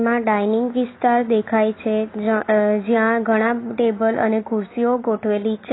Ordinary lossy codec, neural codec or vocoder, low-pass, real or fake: AAC, 16 kbps; none; 7.2 kHz; real